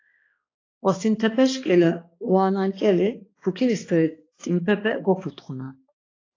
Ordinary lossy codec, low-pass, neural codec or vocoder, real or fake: AAC, 32 kbps; 7.2 kHz; codec, 16 kHz, 2 kbps, X-Codec, HuBERT features, trained on balanced general audio; fake